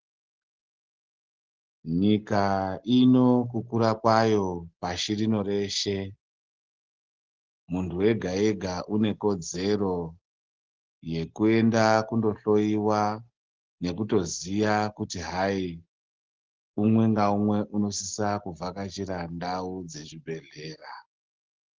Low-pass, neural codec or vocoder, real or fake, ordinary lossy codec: 7.2 kHz; none; real; Opus, 16 kbps